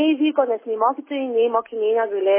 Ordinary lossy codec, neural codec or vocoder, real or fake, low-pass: MP3, 16 kbps; none; real; 3.6 kHz